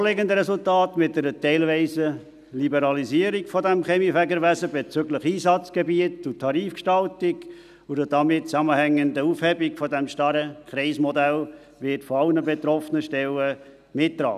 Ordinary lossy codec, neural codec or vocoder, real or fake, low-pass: none; none; real; 14.4 kHz